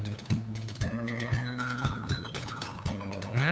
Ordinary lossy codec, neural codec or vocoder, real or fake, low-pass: none; codec, 16 kHz, 2 kbps, FunCodec, trained on LibriTTS, 25 frames a second; fake; none